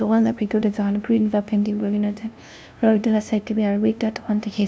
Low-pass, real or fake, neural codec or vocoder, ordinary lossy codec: none; fake; codec, 16 kHz, 0.5 kbps, FunCodec, trained on LibriTTS, 25 frames a second; none